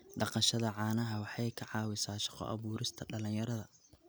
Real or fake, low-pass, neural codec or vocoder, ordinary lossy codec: real; none; none; none